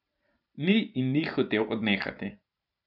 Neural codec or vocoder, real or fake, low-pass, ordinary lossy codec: vocoder, 44.1 kHz, 128 mel bands every 512 samples, BigVGAN v2; fake; 5.4 kHz; none